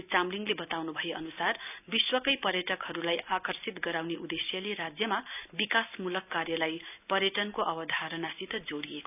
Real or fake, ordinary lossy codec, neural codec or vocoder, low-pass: real; none; none; 3.6 kHz